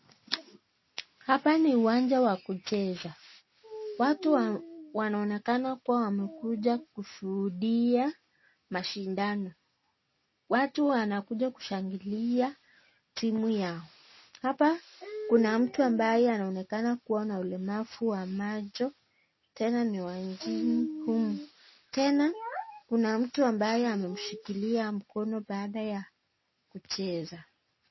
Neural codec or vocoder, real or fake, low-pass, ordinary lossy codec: none; real; 7.2 kHz; MP3, 24 kbps